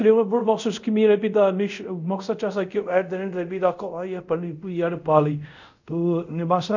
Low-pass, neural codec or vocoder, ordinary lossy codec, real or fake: 7.2 kHz; codec, 24 kHz, 0.5 kbps, DualCodec; none; fake